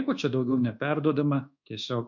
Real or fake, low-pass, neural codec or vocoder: fake; 7.2 kHz; codec, 24 kHz, 1.2 kbps, DualCodec